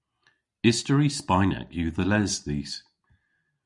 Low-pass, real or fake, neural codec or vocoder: 10.8 kHz; real; none